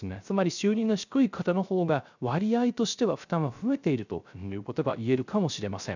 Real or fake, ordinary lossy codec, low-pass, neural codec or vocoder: fake; none; 7.2 kHz; codec, 16 kHz, 0.3 kbps, FocalCodec